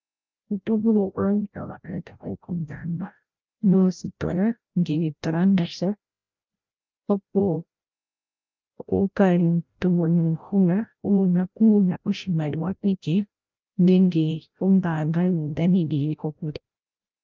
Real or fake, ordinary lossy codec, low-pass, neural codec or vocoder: fake; Opus, 24 kbps; 7.2 kHz; codec, 16 kHz, 0.5 kbps, FreqCodec, larger model